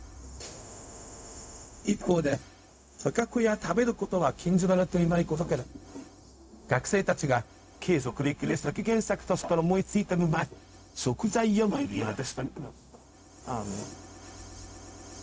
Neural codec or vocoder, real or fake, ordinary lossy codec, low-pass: codec, 16 kHz, 0.4 kbps, LongCat-Audio-Codec; fake; none; none